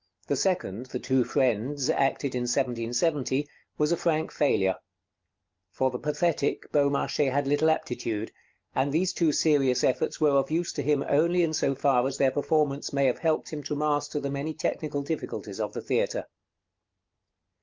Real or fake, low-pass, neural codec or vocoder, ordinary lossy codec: real; 7.2 kHz; none; Opus, 24 kbps